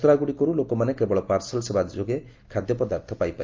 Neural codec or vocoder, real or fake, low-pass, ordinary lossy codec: none; real; 7.2 kHz; Opus, 24 kbps